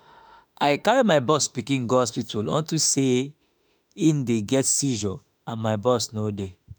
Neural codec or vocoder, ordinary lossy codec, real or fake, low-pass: autoencoder, 48 kHz, 32 numbers a frame, DAC-VAE, trained on Japanese speech; none; fake; none